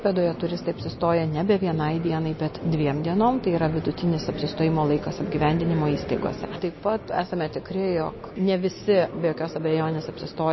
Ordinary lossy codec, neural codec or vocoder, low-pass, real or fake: MP3, 24 kbps; none; 7.2 kHz; real